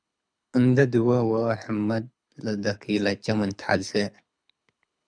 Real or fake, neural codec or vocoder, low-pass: fake; codec, 24 kHz, 6 kbps, HILCodec; 9.9 kHz